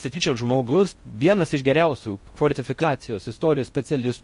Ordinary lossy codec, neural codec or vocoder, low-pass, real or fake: MP3, 48 kbps; codec, 16 kHz in and 24 kHz out, 0.6 kbps, FocalCodec, streaming, 4096 codes; 10.8 kHz; fake